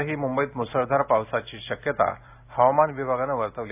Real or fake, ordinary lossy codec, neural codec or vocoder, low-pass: real; AAC, 32 kbps; none; 3.6 kHz